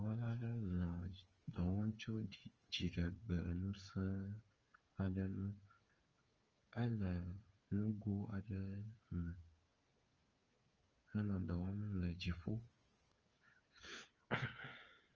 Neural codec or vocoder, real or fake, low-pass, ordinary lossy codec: codec, 16 kHz, 8 kbps, FreqCodec, smaller model; fake; 7.2 kHz; Opus, 24 kbps